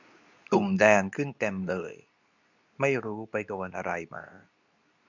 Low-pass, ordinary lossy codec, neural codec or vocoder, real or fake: 7.2 kHz; none; codec, 24 kHz, 0.9 kbps, WavTokenizer, medium speech release version 2; fake